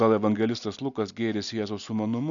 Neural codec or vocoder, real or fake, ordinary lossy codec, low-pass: none; real; MP3, 96 kbps; 7.2 kHz